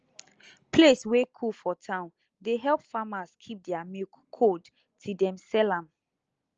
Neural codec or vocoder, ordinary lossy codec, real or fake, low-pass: none; Opus, 24 kbps; real; 7.2 kHz